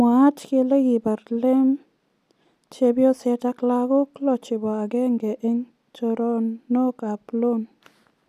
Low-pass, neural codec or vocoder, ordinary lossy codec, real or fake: 14.4 kHz; none; none; real